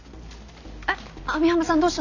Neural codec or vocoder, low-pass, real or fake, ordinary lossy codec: none; 7.2 kHz; real; none